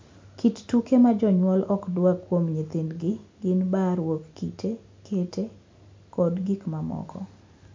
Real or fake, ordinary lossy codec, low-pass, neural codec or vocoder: real; MP3, 48 kbps; 7.2 kHz; none